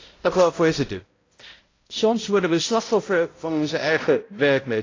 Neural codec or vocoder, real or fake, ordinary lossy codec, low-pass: codec, 16 kHz, 0.5 kbps, X-Codec, HuBERT features, trained on balanced general audio; fake; AAC, 32 kbps; 7.2 kHz